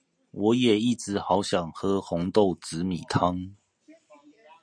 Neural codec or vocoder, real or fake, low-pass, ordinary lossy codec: none; real; 9.9 kHz; MP3, 64 kbps